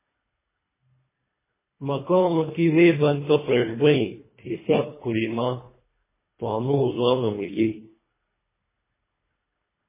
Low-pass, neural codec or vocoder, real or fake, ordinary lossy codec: 3.6 kHz; codec, 24 kHz, 1.5 kbps, HILCodec; fake; MP3, 16 kbps